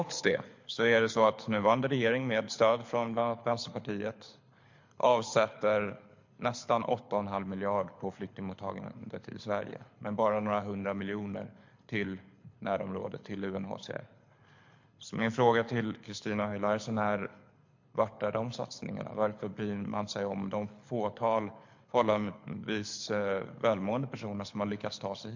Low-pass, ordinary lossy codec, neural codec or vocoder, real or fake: 7.2 kHz; MP3, 48 kbps; codec, 24 kHz, 6 kbps, HILCodec; fake